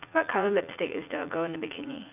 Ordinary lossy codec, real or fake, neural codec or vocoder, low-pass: none; fake; vocoder, 44.1 kHz, 80 mel bands, Vocos; 3.6 kHz